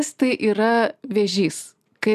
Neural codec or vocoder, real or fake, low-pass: none; real; 14.4 kHz